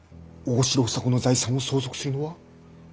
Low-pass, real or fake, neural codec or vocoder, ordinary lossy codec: none; real; none; none